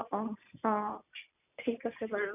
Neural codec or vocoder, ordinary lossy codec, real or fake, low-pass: none; none; real; 3.6 kHz